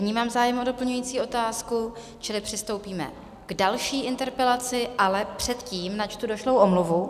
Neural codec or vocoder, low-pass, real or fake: none; 14.4 kHz; real